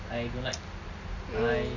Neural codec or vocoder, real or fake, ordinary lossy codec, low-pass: none; real; none; 7.2 kHz